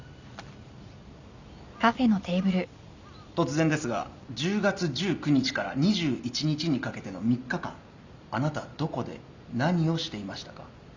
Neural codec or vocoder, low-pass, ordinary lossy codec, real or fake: none; 7.2 kHz; Opus, 64 kbps; real